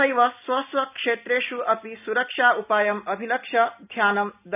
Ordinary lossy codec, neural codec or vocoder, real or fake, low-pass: none; none; real; 3.6 kHz